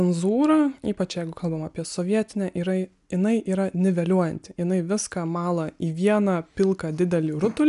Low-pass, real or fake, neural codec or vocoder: 10.8 kHz; real; none